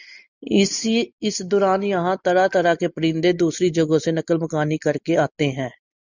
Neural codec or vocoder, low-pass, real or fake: none; 7.2 kHz; real